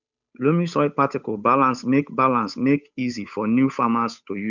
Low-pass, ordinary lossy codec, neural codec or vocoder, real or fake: 7.2 kHz; none; codec, 16 kHz, 8 kbps, FunCodec, trained on Chinese and English, 25 frames a second; fake